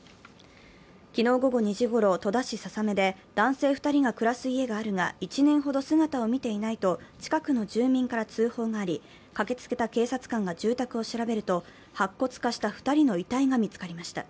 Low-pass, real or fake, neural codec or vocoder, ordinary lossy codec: none; real; none; none